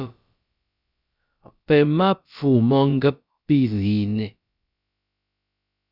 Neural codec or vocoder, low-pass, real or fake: codec, 16 kHz, about 1 kbps, DyCAST, with the encoder's durations; 5.4 kHz; fake